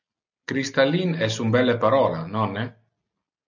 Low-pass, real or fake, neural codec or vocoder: 7.2 kHz; real; none